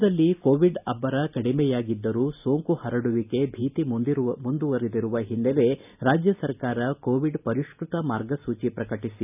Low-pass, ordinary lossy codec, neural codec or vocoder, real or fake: 3.6 kHz; none; none; real